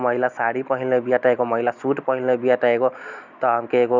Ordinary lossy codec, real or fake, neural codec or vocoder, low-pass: none; real; none; 7.2 kHz